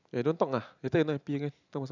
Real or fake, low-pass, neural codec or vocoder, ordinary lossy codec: real; 7.2 kHz; none; none